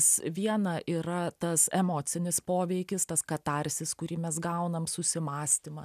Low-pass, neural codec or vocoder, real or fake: 14.4 kHz; none; real